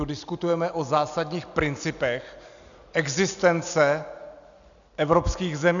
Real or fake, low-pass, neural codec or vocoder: real; 7.2 kHz; none